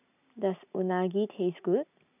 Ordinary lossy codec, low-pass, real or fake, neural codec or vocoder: none; 3.6 kHz; real; none